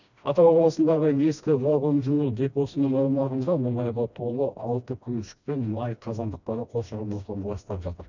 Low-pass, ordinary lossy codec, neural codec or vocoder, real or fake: 7.2 kHz; none; codec, 16 kHz, 1 kbps, FreqCodec, smaller model; fake